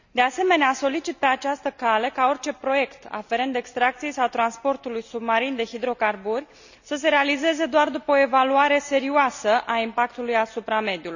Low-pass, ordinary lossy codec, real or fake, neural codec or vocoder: 7.2 kHz; none; real; none